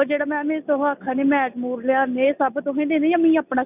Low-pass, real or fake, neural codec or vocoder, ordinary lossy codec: 3.6 kHz; real; none; none